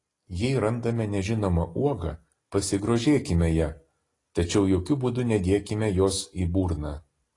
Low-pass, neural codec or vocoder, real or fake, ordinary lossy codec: 10.8 kHz; none; real; AAC, 32 kbps